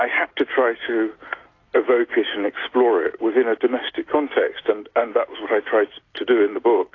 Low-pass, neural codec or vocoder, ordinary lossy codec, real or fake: 7.2 kHz; none; AAC, 32 kbps; real